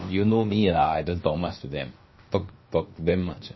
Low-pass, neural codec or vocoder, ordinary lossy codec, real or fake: 7.2 kHz; codec, 16 kHz, about 1 kbps, DyCAST, with the encoder's durations; MP3, 24 kbps; fake